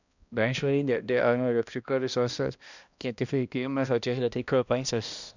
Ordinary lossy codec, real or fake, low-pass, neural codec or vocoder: none; fake; 7.2 kHz; codec, 16 kHz, 1 kbps, X-Codec, HuBERT features, trained on balanced general audio